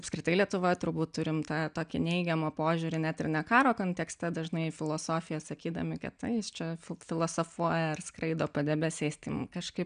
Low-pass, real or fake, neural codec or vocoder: 9.9 kHz; real; none